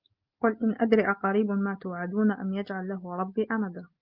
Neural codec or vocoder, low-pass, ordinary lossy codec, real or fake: none; 5.4 kHz; Opus, 24 kbps; real